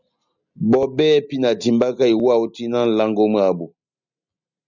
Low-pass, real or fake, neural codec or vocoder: 7.2 kHz; real; none